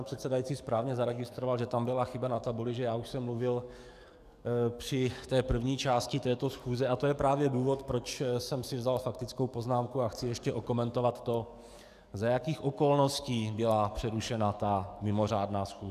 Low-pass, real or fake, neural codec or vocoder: 14.4 kHz; fake; codec, 44.1 kHz, 7.8 kbps, DAC